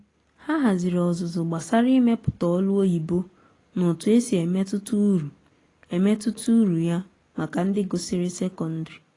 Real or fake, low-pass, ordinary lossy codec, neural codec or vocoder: real; 10.8 kHz; AAC, 32 kbps; none